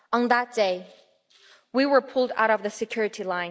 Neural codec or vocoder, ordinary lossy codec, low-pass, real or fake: none; none; none; real